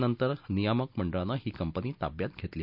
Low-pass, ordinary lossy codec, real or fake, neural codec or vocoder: 5.4 kHz; none; real; none